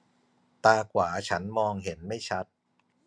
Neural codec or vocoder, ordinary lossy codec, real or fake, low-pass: none; none; real; none